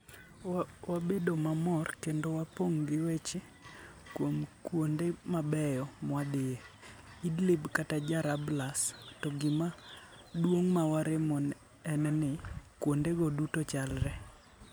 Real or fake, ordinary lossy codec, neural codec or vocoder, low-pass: real; none; none; none